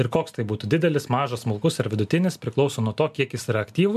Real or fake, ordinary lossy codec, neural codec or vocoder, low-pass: real; MP3, 96 kbps; none; 14.4 kHz